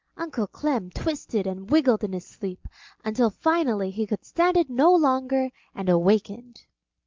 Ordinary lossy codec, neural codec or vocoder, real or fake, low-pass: Opus, 24 kbps; none; real; 7.2 kHz